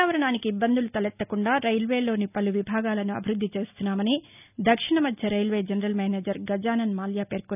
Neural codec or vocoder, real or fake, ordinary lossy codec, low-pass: none; real; none; 3.6 kHz